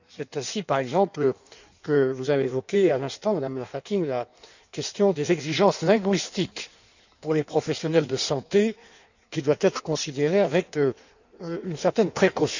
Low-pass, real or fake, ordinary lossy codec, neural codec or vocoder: 7.2 kHz; fake; none; codec, 16 kHz in and 24 kHz out, 1.1 kbps, FireRedTTS-2 codec